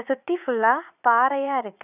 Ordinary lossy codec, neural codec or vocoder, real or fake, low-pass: none; vocoder, 44.1 kHz, 80 mel bands, Vocos; fake; 3.6 kHz